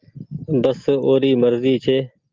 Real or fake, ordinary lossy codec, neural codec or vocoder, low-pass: real; Opus, 24 kbps; none; 7.2 kHz